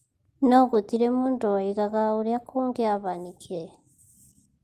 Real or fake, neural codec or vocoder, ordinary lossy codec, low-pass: real; none; Opus, 24 kbps; 14.4 kHz